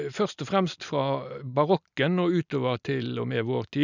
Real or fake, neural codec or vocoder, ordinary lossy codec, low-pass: real; none; none; 7.2 kHz